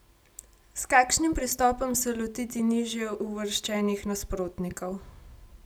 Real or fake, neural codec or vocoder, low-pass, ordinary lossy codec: real; none; none; none